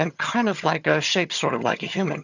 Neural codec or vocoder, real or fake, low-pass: vocoder, 22.05 kHz, 80 mel bands, HiFi-GAN; fake; 7.2 kHz